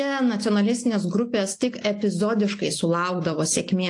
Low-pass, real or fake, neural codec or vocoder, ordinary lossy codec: 10.8 kHz; real; none; AAC, 48 kbps